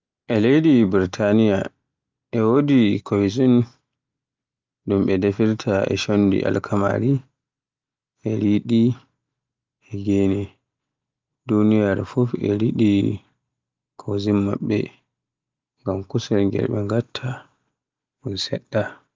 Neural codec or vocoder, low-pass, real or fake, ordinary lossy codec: none; 7.2 kHz; real; Opus, 24 kbps